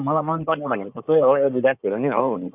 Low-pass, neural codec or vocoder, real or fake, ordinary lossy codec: 3.6 kHz; codec, 16 kHz in and 24 kHz out, 2.2 kbps, FireRedTTS-2 codec; fake; none